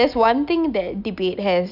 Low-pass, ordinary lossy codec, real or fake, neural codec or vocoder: 5.4 kHz; none; real; none